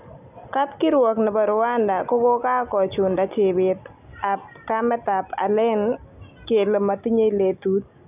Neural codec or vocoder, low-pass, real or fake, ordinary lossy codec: none; 3.6 kHz; real; none